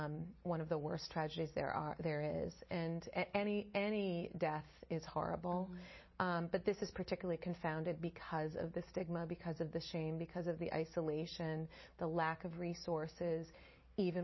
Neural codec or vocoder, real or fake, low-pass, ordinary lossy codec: none; real; 7.2 kHz; MP3, 24 kbps